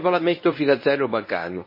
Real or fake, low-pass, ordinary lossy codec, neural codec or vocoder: fake; 5.4 kHz; MP3, 24 kbps; codec, 24 kHz, 0.9 kbps, WavTokenizer, medium speech release version 1